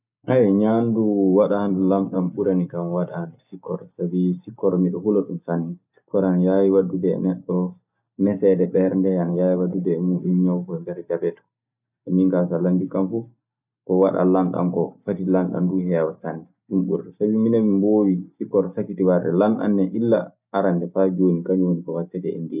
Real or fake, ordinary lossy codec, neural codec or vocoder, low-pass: real; none; none; 3.6 kHz